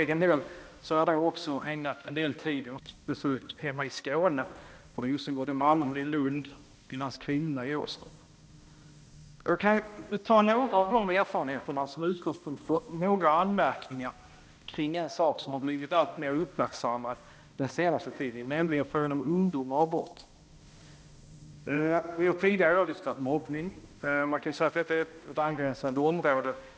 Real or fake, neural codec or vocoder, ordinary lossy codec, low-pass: fake; codec, 16 kHz, 1 kbps, X-Codec, HuBERT features, trained on balanced general audio; none; none